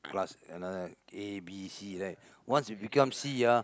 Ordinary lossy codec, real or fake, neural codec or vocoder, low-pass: none; real; none; none